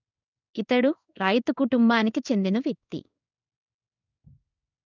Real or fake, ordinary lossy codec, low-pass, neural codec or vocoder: fake; none; 7.2 kHz; codec, 16 kHz in and 24 kHz out, 1 kbps, XY-Tokenizer